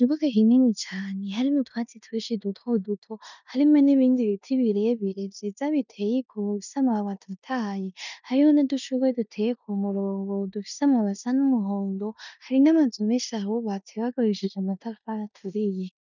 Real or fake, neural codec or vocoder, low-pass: fake; codec, 16 kHz in and 24 kHz out, 0.9 kbps, LongCat-Audio-Codec, four codebook decoder; 7.2 kHz